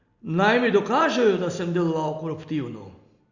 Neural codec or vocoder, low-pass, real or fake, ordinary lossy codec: none; 7.2 kHz; real; Opus, 64 kbps